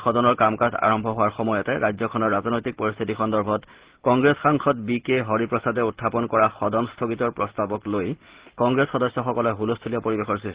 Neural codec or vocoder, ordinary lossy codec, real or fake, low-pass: none; Opus, 16 kbps; real; 3.6 kHz